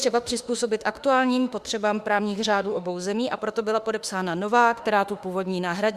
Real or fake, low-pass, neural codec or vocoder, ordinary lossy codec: fake; 14.4 kHz; autoencoder, 48 kHz, 32 numbers a frame, DAC-VAE, trained on Japanese speech; Opus, 64 kbps